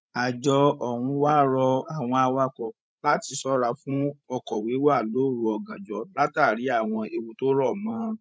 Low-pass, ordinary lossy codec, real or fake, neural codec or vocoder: none; none; fake; codec, 16 kHz, 16 kbps, FreqCodec, larger model